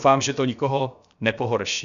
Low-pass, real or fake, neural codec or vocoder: 7.2 kHz; fake; codec, 16 kHz, about 1 kbps, DyCAST, with the encoder's durations